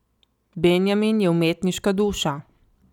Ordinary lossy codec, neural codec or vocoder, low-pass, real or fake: none; none; 19.8 kHz; real